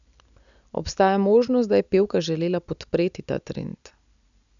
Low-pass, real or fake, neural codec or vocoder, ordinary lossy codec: 7.2 kHz; real; none; none